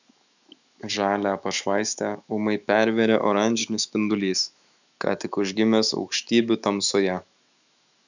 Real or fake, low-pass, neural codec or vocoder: fake; 7.2 kHz; autoencoder, 48 kHz, 128 numbers a frame, DAC-VAE, trained on Japanese speech